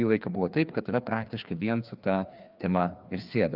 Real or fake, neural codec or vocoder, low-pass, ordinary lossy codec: fake; codec, 16 kHz, 2 kbps, FreqCodec, larger model; 5.4 kHz; Opus, 24 kbps